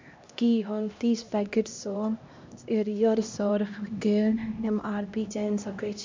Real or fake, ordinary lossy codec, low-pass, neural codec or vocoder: fake; MP3, 64 kbps; 7.2 kHz; codec, 16 kHz, 1 kbps, X-Codec, HuBERT features, trained on LibriSpeech